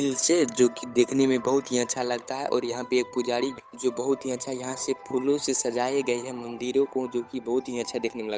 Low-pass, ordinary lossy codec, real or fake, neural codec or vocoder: none; none; fake; codec, 16 kHz, 8 kbps, FunCodec, trained on Chinese and English, 25 frames a second